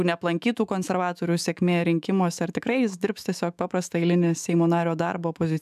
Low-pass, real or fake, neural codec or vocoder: 14.4 kHz; fake; autoencoder, 48 kHz, 128 numbers a frame, DAC-VAE, trained on Japanese speech